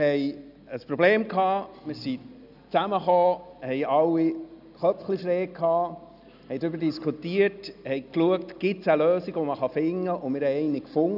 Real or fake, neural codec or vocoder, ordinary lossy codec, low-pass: real; none; none; 5.4 kHz